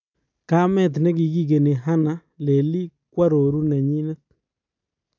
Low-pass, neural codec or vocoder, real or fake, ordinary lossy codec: 7.2 kHz; none; real; none